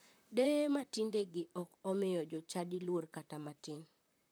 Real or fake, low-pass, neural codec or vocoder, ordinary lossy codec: fake; none; vocoder, 44.1 kHz, 128 mel bands, Pupu-Vocoder; none